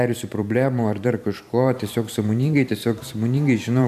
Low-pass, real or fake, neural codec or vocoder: 14.4 kHz; fake; vocoder, 44.1 kHz, 128 mel bands every 512 samples, BigVGAN v2